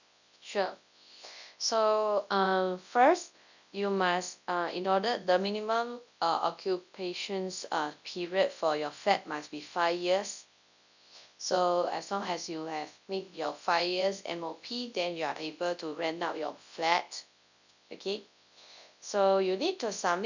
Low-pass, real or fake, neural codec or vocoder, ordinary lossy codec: 7.2 kHz; fake; codec, 24 kHz, 0.9 kbps, WavTokenizer, large speech release; none